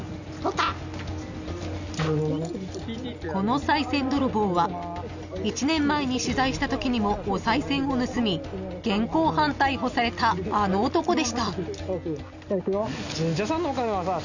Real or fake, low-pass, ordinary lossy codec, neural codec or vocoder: real; 7.2 kHz; none; none